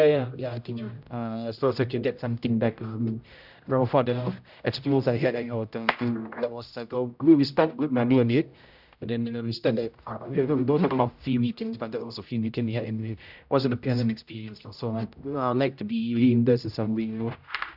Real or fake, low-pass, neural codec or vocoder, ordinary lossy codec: fake; 5.4 kHz; codec, 16 kHz, 0.5 kbps, X-Codec, HuBERT features, trained on general audio; none